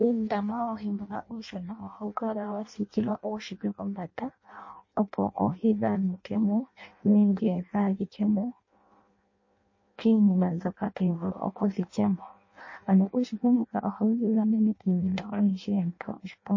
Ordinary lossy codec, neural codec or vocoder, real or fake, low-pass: MP3, 32 kbps; codec, 16 kHz in and 24 kHz out, 0.6 kbps, FireRedTTS-2 codec; fake; 7.2 kHz